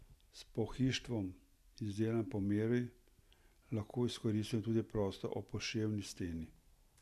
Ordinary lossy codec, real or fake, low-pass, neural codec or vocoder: none; real; 14.4 kHz; none